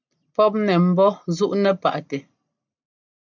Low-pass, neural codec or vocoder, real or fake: 7.2 kHz; none; real